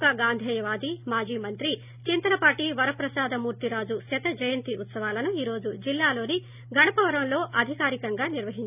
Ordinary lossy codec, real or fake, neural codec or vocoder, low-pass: none; real; none; 3.6 kHz